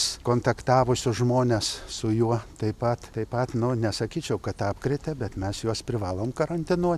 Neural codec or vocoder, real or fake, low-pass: none; real; 14.4 kHz